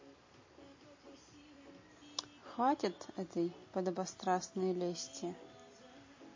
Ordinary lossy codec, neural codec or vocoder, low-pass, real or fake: MP3, 32 kbps; none; 7.2 kHz; real